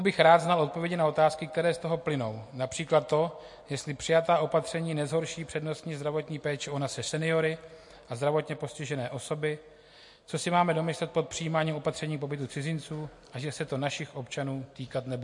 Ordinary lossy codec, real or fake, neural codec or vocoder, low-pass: MP3, 48 kbps; real; none; 10.8 kHz